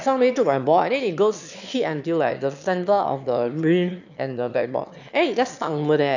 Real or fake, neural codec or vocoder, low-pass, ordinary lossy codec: fake; autoencoder, 22.05 kHz, a latent of 192 numbers a frame, VITS, trained on one speaker; 7.2 kHz; none